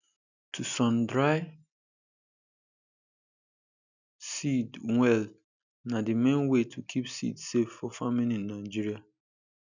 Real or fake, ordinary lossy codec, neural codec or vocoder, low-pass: real; none; none; 7.2 kHz